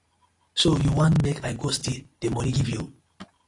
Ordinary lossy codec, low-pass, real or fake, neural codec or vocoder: AAC, 48 kbps; 10.8 kHz; real; none